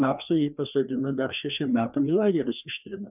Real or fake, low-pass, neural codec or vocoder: fake; 3.6 kHz; codec, 16 kHz, 2 kbps, FreqCodec, larger model